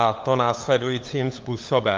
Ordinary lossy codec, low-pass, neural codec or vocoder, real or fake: Opus, 32 kbps; 7.2 kHz; codec, 16 kHz, 8 kbps, FunCodec, trained on LibriTTS, 25 frames a second; fake